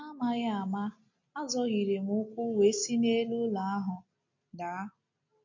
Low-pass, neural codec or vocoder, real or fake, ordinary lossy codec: 7.2 kHz; none; real; MP3, 48 kbps